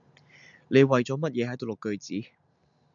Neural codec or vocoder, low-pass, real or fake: none; 7.2 kHz; real